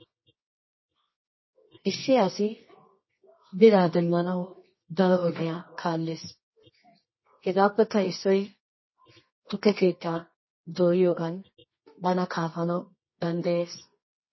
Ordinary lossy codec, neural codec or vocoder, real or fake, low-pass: MP3, 24 kbps; codec, 24 kHz, 0.9 kbps, WavTokenizer, medium music audio release; fake; 7.2 kHz